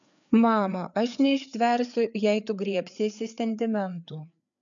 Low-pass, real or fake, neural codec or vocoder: 7.2 kHz; fake; codec, 16 kHz, 4 kbps, FreqCodec, larger model